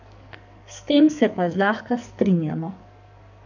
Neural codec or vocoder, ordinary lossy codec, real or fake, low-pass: codec, 44.1 kHz, 2.6 kbps, SNAC; none; fake; 7.2 kHz